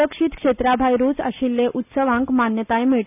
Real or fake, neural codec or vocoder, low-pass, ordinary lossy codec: real; none; 3.6 kHz; none